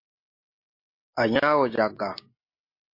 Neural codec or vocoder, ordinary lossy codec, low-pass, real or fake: none; MP3, 32 kbps; 5.4 kHz; real